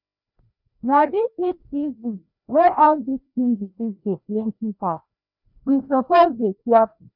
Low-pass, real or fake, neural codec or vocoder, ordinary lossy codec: 5.4 kHz; fake; codec, 16 kHz, 1 kbps, FreqCodec, larger model; none